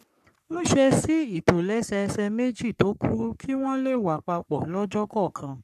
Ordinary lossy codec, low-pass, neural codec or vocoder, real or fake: none; 14.4 kHz; codec, 44.1 kHz, 3.4 kbps, Pupu-Codec; fake